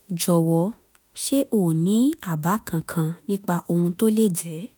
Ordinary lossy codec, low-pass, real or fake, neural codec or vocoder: none; none; fake; autoencoder, 48 kHz, 32 numbers a frame, DAC-VAE, trained on Japanese speech